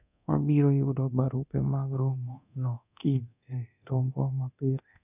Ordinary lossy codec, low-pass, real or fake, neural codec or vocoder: none; 3.6 kHz; fake; codec, 24 kHz, 0.9 kbps, DualCodec